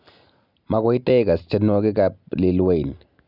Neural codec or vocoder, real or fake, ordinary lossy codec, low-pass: none; real; none; 5.4 kHz